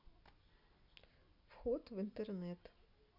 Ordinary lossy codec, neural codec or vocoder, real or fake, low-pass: none; none; real; 5.4 kHz